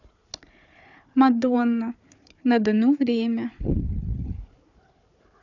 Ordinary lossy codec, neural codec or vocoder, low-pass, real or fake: none; codec, 16 kHz, 4 kbps, FunCodec, trained on Chinese and English, 50 frames a second; 7.2 kHz; fake